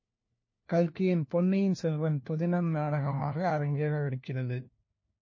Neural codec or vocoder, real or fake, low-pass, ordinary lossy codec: codec, 16 kHz, 1 kbps, FunCodec, trained on LibriTTS, 50 frames a second; fake; 7.2 kHz; MP3, 32 kbps